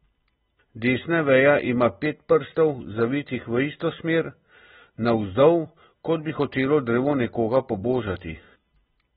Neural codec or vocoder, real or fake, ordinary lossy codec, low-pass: none; real; AAC, 16 kbps; 7.2 kHz